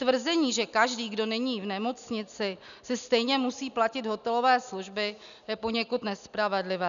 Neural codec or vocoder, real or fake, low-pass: none; real; 7.2 kHz